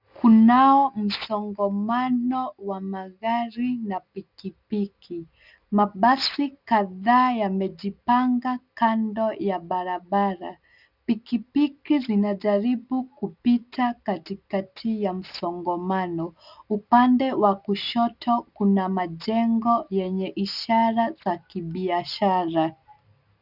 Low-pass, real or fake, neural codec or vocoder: 5.4 kHz; real; none